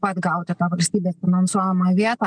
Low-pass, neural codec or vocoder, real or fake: 9.9 kHz; none; real